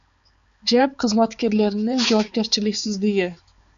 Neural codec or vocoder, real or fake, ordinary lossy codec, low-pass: codec, 16 kHz, 4 kbps, X-Codec, HuBERT features, trained on balanced general audio; fake; Opus, 64 kbps; 7.2 kHz